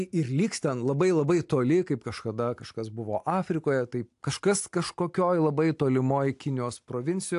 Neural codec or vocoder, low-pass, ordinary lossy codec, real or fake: none; 10.8 kHz; AAC, 64 kbps; real